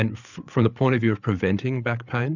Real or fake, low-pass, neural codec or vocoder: fake; 7.2 kHz; codec, 16 kHz, 8 kbps, FreqCodec, larger model